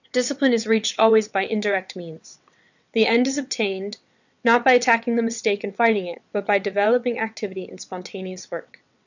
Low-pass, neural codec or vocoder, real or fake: 7.2 kHz; vocoder, 22.05 kHz, 80 mel bands, WaveNeXt; fake